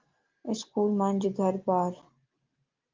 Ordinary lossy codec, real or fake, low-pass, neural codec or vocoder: Opus, 24 kbps; real; 7.2 kHz; none